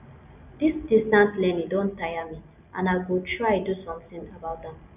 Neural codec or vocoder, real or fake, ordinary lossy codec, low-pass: none; real; none; 3.6 kHz